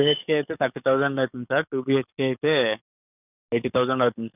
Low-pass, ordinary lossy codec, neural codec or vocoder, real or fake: 3.6 kHz; none; codec, 44.1 kHz, 7.8 kbps, DAC; fake